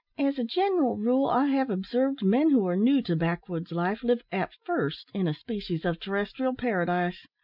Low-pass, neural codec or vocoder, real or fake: 5.4 kHz; none; real